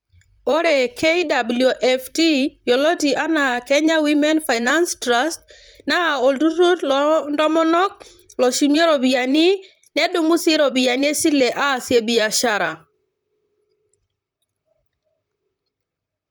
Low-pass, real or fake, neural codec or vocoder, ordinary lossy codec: none; fake; vocoder, 44.1 kHz, 128 mel bands, Pupu-Vocoder; none